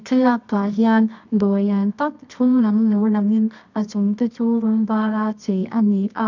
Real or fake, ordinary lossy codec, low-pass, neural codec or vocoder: fake; none; 7.2 kHz; codec, 24 kHz, 0.9 kbps, WavTokenizer, medium music audio release